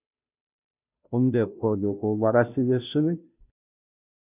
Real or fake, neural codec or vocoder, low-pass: fake; codec, 16 kHz, 0.5 kbps, FunCodec, trained on Chinese and English, 25 frames a second; 3.6 kHz